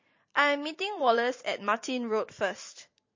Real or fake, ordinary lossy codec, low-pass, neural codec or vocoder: fake; MP3, 32 kbps; 7.2 kHz; vocoder, 44.1 kHz, 128 mel bands every 512 samples, BigVGAN v2